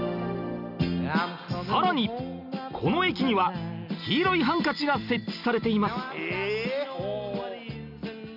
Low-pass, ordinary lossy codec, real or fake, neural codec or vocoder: 5.4 kHz; none; real; none